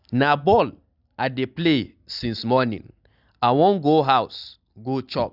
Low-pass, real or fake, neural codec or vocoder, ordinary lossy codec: 5.4 kHz; real; none; none